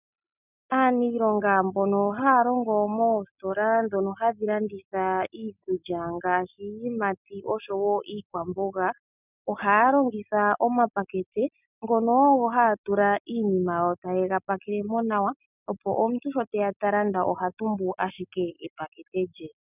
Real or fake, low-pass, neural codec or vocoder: real; 3.6 kHz; none